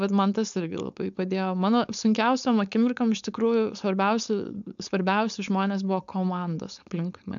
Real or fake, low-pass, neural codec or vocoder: fake; 7.2 kHz; codec, 16 kHz, 4.8 kbps, FACodec